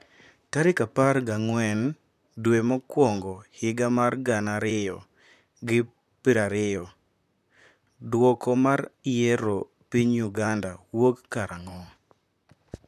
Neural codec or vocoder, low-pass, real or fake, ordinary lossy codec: vocoder, 44.1 kHz, 128 mel bands, Pupu-Vocoder; 14.4 kHz; fake; none